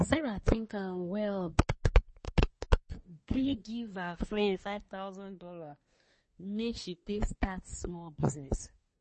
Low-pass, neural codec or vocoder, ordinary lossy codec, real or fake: 10.8 kHz; codec, 24 kHz, 1 kbps, SNAC; MP3, 32 kbps; fake